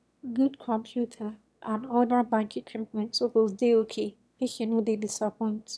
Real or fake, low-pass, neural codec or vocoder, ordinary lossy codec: fake; none; autoencoder, 22.05 kHz, a latent of 192 numbers a frame, VITS, trained on one speaker; none